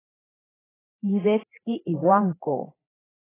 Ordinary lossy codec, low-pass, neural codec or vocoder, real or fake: AAC, 16 kbps; 3.6 kHz; codec, 16 kHz, 4 kbps, FreqCodec, larger model; fake